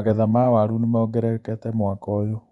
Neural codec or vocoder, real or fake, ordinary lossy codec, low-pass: vocoder, 24 kHz, 100 mel bands, Vocos; fake; none; 10.8 kHz